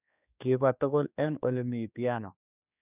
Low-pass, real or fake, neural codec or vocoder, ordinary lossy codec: 3.6 kHz; fake; codec, 16 kHz, 2 kbps, X-Codec, HuBERT features, trained on general audio; none